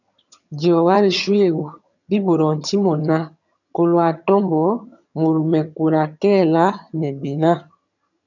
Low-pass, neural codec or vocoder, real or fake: 7.2 kHz; vocoder, 22.05 kHz, 80 mel bands, HiFi-GAN; fake